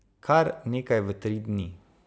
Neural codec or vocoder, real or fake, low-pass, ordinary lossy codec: none; real; none; none